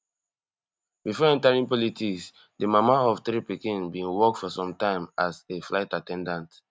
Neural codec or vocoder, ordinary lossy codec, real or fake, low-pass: none; none; real; none